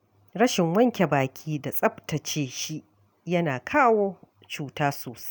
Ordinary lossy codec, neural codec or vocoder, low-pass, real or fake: none; none; none; real